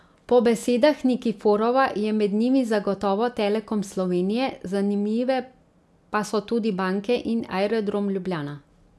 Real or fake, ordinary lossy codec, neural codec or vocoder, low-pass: real; none; none; none